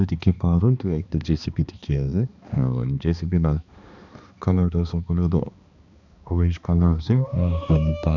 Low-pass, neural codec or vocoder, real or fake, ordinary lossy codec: 7.2 kHz; codec, 16 kHz, 2 kbps, X-Codec, HuBERT features, trained on balanced general audio; fake; none